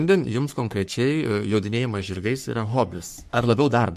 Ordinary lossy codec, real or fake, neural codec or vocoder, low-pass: MP3, 64 kbps; fake; codec, 44.1 kHz, 3.4 kbps, Pupu-Codec; 14.4 kHz